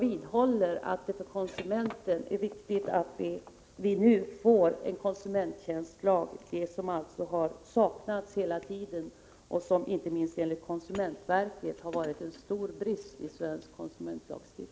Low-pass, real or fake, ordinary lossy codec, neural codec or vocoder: none; real; none; none